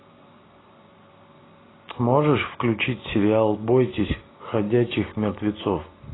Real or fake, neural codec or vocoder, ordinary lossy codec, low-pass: real; none; AAC, 16 kbps; 7.2 kHz